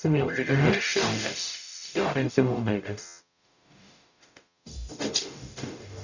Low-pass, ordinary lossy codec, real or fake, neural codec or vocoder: 7.2 kHz; none; fake; codec, 44.1 kHz, 0.9 kbps, DAC